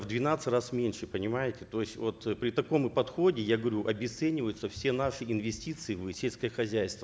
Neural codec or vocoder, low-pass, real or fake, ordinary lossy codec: none; none; real; none